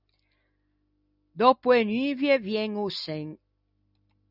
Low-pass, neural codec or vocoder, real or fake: 5.4 kHz; none; real